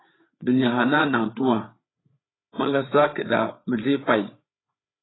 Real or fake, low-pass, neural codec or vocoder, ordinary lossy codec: fake; 7.2 kHz; codec, 16 kHz, 8 kbps, FreqCodec, larger model; AAC, 16 kbps